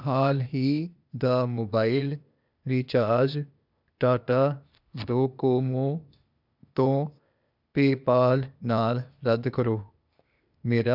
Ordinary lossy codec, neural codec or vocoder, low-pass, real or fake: none; codec, 16 kHz, 0.8 kbps, ZipCodec; 5.4 kHz; fake